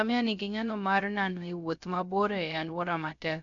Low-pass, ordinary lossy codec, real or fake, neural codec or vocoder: 7.2 kHz; none; fake; codec, 16 kHz, 0.3 kbps, FocalCodec